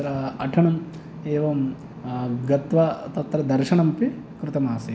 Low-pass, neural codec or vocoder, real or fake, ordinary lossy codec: none; none; real; none